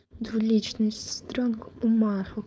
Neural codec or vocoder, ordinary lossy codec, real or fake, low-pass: codec, 16 kHz, 4.8 kbps, FACodec; none; fake; none